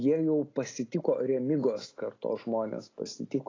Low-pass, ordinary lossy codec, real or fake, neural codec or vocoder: 7.2 kHz; AAC, 32 kbps; real; none